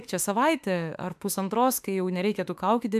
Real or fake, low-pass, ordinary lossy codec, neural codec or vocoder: fake; 14.4 kHz; AAC, 96 kbps; autoencoder, 48 kHz, 32 numbers a frame, DAC-VAE, trained on Japanese speech